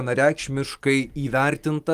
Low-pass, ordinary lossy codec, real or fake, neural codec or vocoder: 14.4 kHz; Opus, 32 kbps; real; none